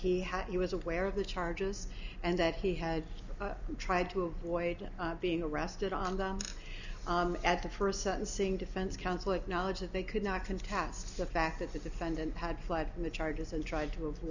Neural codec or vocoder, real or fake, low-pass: none; real; 7.2 kHz